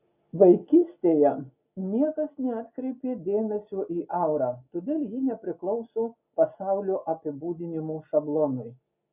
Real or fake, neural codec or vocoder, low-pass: real; none; 3.6 kHz